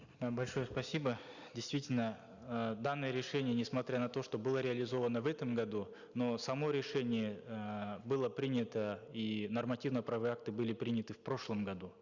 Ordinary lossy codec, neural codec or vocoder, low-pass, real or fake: Opus, 64 kbps; none; 7.2 kHz; real